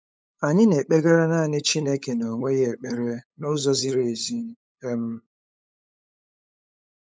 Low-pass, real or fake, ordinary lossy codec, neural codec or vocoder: none; fake; none; codec, 16 kHz, 8 kbps, FunCodec, trained on LibriTTS, 25 frames a second